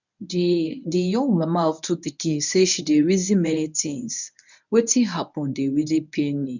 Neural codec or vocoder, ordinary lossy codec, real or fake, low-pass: codec, 24 kHz, 0.9 kbps, WavTokenizer, medium speech release version 1; none; fake; 7.2 kHz